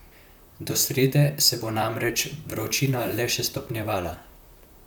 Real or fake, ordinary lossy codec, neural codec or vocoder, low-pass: fake; none; vocoder, 44.1 kHz, 128 mel bands, Pupu-Vocoder; none